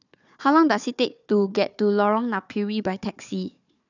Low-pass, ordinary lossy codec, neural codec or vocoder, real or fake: 7.2 kHz; none; codec, 16 kHz, 4 kbps, FunCodec, trained on Chinese and English, 50 frames a second; fake